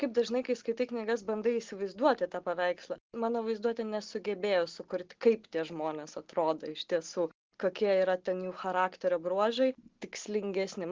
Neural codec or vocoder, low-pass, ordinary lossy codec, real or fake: none; 7.2 kHz; Opus, 16 kbps; real